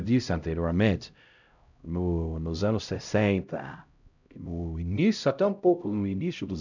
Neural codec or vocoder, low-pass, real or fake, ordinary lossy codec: codec, 16 kHz, 0.5 kbps, X-Codec, HuBERT features, trained on LibriSpeech; 7.2 kHz; fake; none